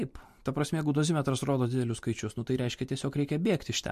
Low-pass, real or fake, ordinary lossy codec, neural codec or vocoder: 14.4 kHz; real; MP3, 64 kbps; none